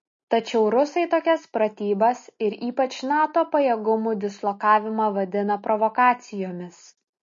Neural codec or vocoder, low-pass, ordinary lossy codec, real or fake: none; 7.2 kHz; MP3, 32 kbps; real